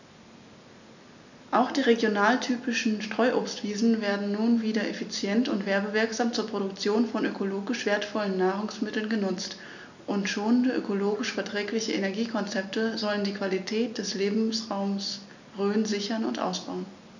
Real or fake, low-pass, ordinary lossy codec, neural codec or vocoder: real; 7.2 kHz; none; none